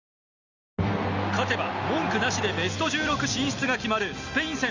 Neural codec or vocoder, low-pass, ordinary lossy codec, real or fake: none; 7.2 kHz; none; real